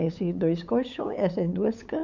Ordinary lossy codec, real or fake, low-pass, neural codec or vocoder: none; fake; 7.2 kHz; codec, 16 kHz, 8 kbps, FunCodec, trained on LibriTTS, 25 frames a second